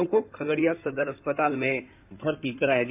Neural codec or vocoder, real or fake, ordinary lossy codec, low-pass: codec, 16 kHz in and 24 kHz out, 2.2 kbps, FireRedTTS-2 codec; fake; none; 3.6 kHz